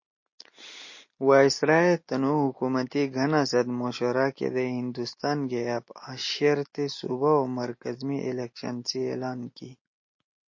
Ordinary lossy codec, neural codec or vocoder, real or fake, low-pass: MP3, 32 kbps; none; real; 7.2 kHz